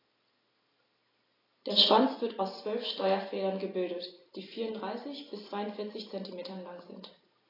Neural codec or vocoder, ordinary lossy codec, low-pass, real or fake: none; AAC, 24 kbps; 5.4 kHz; real